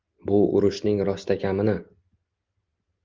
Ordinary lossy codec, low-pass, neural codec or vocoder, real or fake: Opus, 24 kbps; 7.2 kHz; none; real